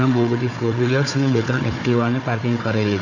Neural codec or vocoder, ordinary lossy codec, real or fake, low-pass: codec, 16 kHz, 4 kbps, FunCodec, trained on Chinese and English, 50 frames a second; none; fake; 7.2 kHz